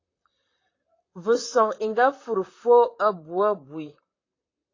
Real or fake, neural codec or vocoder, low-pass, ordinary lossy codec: fake; vocoder, 44.1 kHz, 128 mel bands, Pupu-Vocoder; 7.2 kHz; AAC, 32 kbps